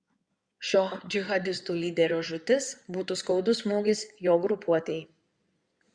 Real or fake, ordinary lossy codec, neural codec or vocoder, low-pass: fake; Opus, 64 kbps; codec, 16 kHz in and 24 kHz out, 2.2 kbps, FireRedTTS-2 codec; 9.9 kHz